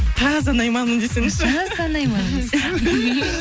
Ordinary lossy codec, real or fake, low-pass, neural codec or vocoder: none; real; none; none